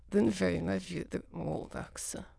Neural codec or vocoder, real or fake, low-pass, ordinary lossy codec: autoencoder, 22.05 kHz, a latent of 192 numbers a frame, VITS, trained on many speakers; fake; none; none